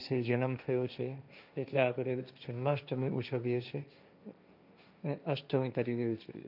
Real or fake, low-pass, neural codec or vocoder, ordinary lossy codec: fake; 5.4 kHz; codec, 16 kHz, 1.1 kbps, Voila-Tokenizer; none